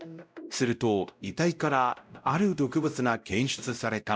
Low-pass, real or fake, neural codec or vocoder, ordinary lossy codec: none; fake; codec, 16 kHz, 0.5 kbps, X-Codec, WavLM features, trained on Multilingual LibriSpeech; none